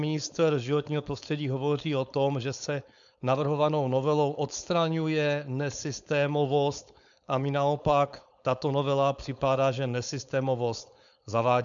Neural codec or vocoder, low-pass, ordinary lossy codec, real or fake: codec, 16 kHz, 4.8 kbps, FACodec; 7.2 kHz; MP3, 96 kbps; fake